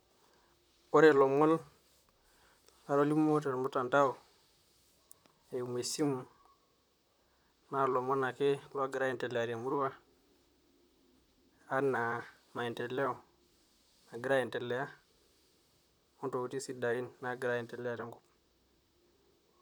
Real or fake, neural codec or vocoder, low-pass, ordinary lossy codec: fake; vocoder, 44.1 kHz, 128 mel bands, Pupu-Vocoder; none; none